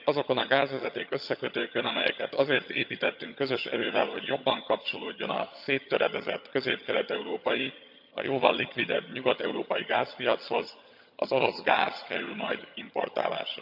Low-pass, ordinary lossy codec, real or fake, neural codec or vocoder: 5.4 kHz; none; fake; vocoder, 22.05 kHz, 80 mel bands, HiFi-GAN